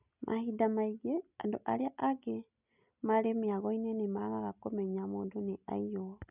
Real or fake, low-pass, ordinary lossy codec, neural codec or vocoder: real; 3.6 kHz; none; none